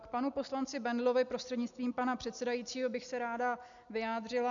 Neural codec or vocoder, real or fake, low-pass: none; real; 7.2 kHz